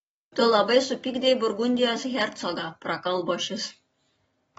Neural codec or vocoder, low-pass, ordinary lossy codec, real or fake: none; 19.8 kHz; AAC, 24 kbps; real